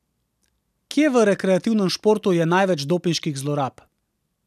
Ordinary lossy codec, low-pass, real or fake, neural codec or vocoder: none; 14.4 kHz; real; none